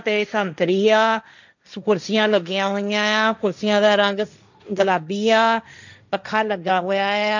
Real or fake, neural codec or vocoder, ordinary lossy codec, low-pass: fake; codec, 16 kHz, 1.1 kbps, Voila-Tokenizer; none; 7.2 kHz